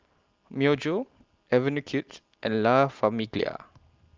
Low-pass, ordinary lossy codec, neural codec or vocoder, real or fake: 7.2 kHz; Opus, 32 kbps; none; real